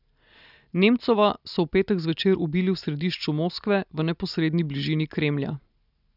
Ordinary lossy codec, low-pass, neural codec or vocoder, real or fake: none; 5.4 kHz; none; real